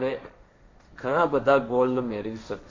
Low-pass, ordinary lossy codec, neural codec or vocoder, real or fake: 7.2 kHz; MP3, 48 kbps; codec, 16 kHz, 1.1 kbps, Voila-Tokenizer; fake